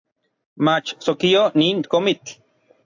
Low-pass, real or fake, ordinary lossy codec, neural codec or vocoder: 7.2 kHz; real; AAC, 48 kbps; none